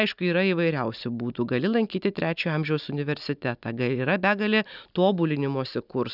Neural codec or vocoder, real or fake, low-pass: none; real; 5.4 kHz